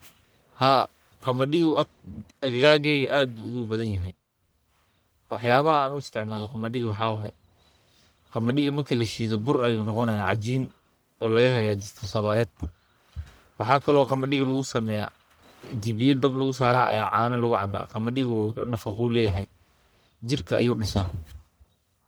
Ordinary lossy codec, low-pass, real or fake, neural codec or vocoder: none; none; fake; codec, 44.1 kHz, 1.7 kbps, Pupu-Codec